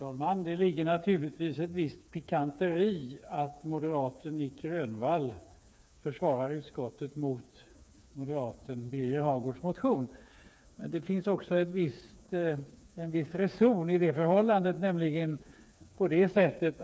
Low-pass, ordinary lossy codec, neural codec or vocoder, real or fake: none; none; codec, 16 kHz, 4 kbps, FreqCodec, smaller model; fake